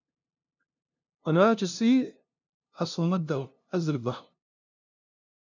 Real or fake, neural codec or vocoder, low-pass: fake; codec, 16 kHz, 0.5 kbps, FunCodec, trained on LibriTTS, 25 frames a second; 7.2 kHz